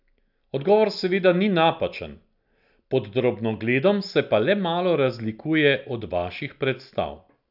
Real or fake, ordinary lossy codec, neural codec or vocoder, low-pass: real; none; none; 5.4 kHz